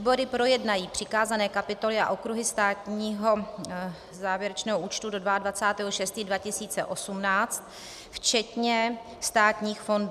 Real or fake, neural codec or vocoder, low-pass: real; none; 14.4 kHz